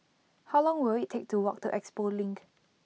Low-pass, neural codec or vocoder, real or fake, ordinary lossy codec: none; none; real; none